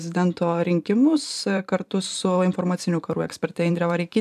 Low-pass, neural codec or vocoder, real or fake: 14.4 kHz; vocoder, 44.1 kHz, 128 mel bands every 512 samples, BigVGAN v2; fake